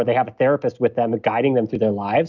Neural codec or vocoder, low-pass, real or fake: none; 7.2 kHz; real